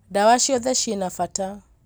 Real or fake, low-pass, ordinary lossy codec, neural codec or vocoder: real; none; none; none